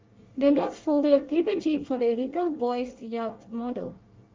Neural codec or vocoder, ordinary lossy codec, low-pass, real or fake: codec, 24 kHz, 1 kbps, SNAC; Opus, 32 kbps; 7.2 kHz; fake